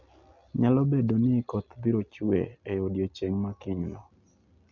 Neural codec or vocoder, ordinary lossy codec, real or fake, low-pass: none; none; real; 7.2 kHz